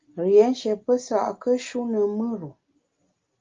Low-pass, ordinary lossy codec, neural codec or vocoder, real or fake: 7.2 kHz; Opus, 32 kbps; none; real